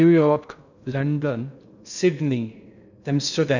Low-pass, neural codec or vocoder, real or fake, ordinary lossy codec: 7.2 kHz; codec, 16 kHz in and 24 kHz out, 0.6 kbps, FocalCodec, streaming, 2048 codes; fake; none